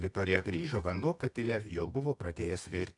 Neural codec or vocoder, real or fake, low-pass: codec, 24 kHz, 0.9 kbps, WavTokenizer, medium music audio release; fake; 10.8 kHz